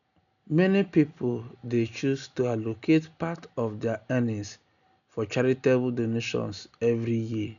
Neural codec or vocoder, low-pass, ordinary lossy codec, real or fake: none; 7.2 kHz; none; real